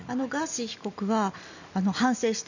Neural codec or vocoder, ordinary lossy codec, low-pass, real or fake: none; none; 7.2 kHz; real